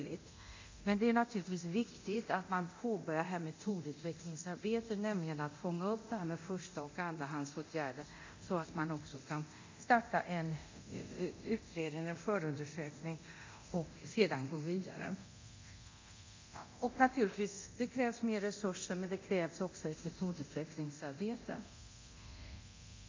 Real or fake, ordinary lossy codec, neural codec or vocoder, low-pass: fake; MP3, 64 kbps; codec, 24 kHz, 0.9 kbps, DualCodec; 7.2 kHz